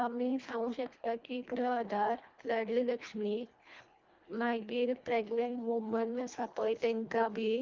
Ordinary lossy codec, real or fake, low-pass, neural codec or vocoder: Opus, 32 kbps; fake; 7.2 kHz; codec, 24 kHz, 1.5 kbps, HILCodec